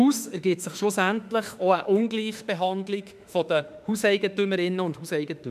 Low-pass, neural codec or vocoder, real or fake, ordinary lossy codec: 14.4 kHz; autoencoder, 48 kHz, 32 numbers a frame, DAC-VAE, trained on Japanese speech; fake; none